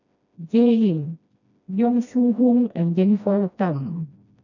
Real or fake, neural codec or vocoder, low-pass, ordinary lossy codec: fake; codec, 16 kHz, 1 kbps, FreqCodec, smaller model; 7.2 kHz; none